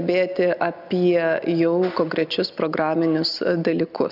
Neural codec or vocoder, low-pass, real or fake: none; 5.4 kHz; real